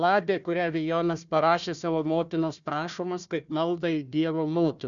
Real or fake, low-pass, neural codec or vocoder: fake; 7.2 kHz; codec, 16 kHz, 1 kbps, FunCodec, trained on Chinese and English, 50 frames a second